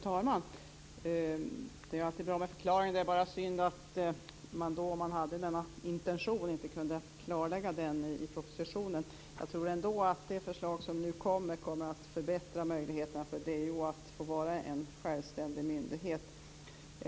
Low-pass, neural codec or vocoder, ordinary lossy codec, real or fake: none; none; none; real